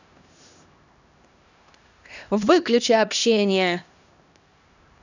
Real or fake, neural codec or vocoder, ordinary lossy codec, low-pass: fake; codec, 16 kHz, 1 kbps, X-Codec, HuBERT features, trained on LibriSpeech; none; 7.2 kHz